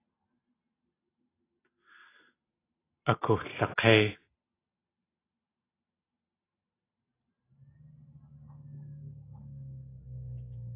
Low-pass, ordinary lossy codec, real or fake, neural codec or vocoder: 3.6 kHz; AAC, 16 kbps; real; none